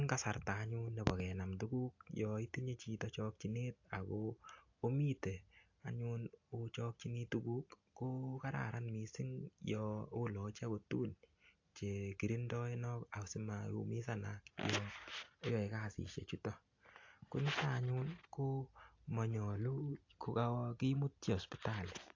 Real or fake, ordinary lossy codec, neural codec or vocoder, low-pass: real; AAC, 48 kbps; none; 7.2 kHz